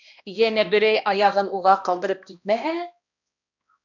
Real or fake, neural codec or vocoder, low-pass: fake; codec, 16 kHz, 1 kbps, X-Codec, HuBERT features, trained on balanced general audio; 7.2 kHz